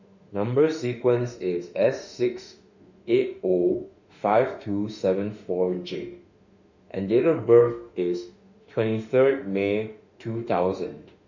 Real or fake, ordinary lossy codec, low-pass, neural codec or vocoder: fake; none; 7.2 kHz; autoencoder, 48 kHz, 32 numbers a frame, DAC-VAE, trained on Japanese speech